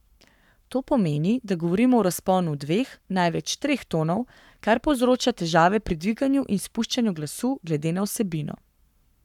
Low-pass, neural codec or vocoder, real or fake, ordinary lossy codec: 19.8 kHz; codec, 44.1 kHz, 7.8 kbps, Pupu-Codec; fake; none